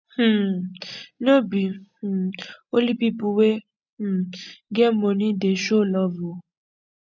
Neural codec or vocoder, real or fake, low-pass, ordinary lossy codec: none; real; 7.2 kHz; none